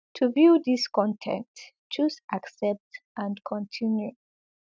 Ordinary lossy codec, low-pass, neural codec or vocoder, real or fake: none; none; none; real